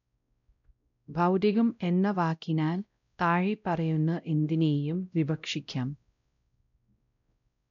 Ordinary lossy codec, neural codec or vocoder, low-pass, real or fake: none; codec, 16 kHz, 0.5 kbps, X-Codec, WavLM features, trained on Multilingual LibriSpeech; 7.2 kHz; fake